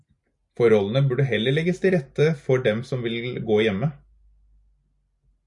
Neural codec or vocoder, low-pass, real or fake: none; 10.8 kHz; real